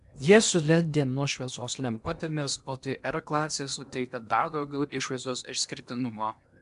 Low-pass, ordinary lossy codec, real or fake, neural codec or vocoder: 10.8 kHz; MP3, 96 kbps; fake; codec, 16 kHz in and 24 kHz out, 0.8 kbps, FocalCodec, streaming, 65536 codes